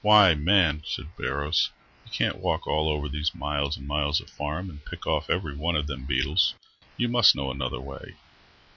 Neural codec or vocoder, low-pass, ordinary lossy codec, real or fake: none; 7.2 kHz; MP3, 48 kbps; real